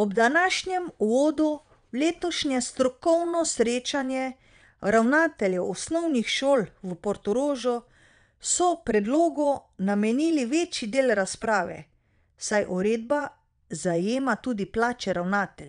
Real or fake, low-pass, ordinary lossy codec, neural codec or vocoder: fake; 9.9 kHz; none; vocoder, 22.05 kHz, 80 mel bands, Vocos